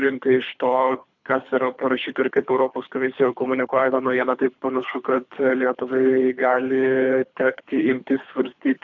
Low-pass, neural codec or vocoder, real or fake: 7.2 kHz; codec, 24 kHz, 3 kbps, HILCodec; fake